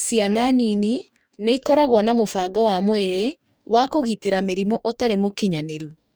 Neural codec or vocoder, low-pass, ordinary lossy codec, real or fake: codec, 44.1 kHz, 2.6 kbps, DAC; none; none; fake